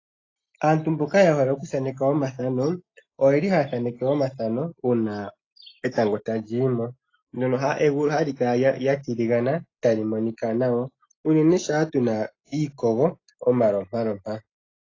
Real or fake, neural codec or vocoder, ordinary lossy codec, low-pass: real; none; AAC, 32 kbps; 7.2 kHz